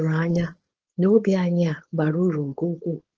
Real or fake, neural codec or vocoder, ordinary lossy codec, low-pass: fake; codec, 16 kHz, 4.8 kbps, FACodec; Opus, 16 kbps; 7.2 kHz